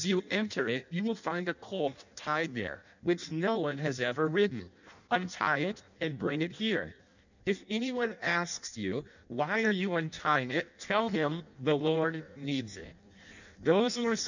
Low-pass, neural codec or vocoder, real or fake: 7.2 kHz; codec, 16 kHz in and 24 kHz out, 0.6 kbps, FireRedTTS-2 codec; fake